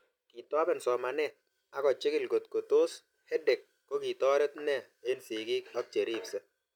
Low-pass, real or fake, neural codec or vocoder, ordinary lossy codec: 19.8 kHz; real; none; none